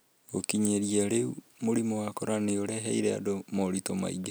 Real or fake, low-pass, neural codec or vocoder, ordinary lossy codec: real; none; none; none